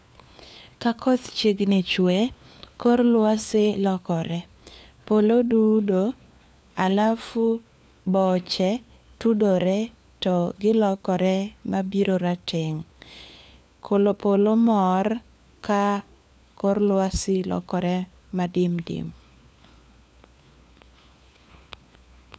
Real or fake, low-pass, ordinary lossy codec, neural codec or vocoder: fake; none; none; codec, 16 kHz, 4 kbps, FunCodec, trained on LibriTTS, 50 frames a second